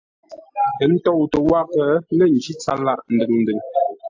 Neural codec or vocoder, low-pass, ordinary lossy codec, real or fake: none; 7.2 kHz; AAC, 48 kbps; real